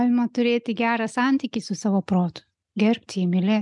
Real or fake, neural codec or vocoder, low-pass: real; none; 10.8 kHz